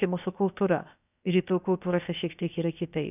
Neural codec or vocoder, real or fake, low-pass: codec, 16 kHz, about 1 kbps, DyCAST, with the encoder's durations; fake; 3.6 kHz